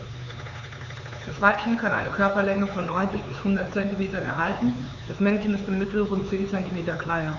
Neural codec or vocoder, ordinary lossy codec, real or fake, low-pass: codec, 16 kHz, 4 kbps, X-Codec, HuBERT features, trained on LibriSpeech; none; fake; 7.2 kHz